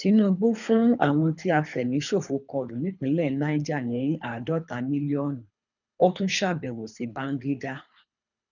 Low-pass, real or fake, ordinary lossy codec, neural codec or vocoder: 7.2 kHz; fake; none; codec, 24 kHz, 3 kbps, HILCodec